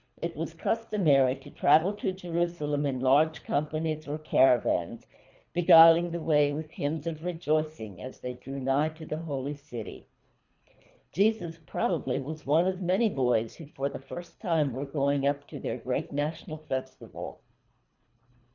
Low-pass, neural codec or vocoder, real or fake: 7.2 kHz; codec, 24 kHz, 3 kbps, HILCodec; fake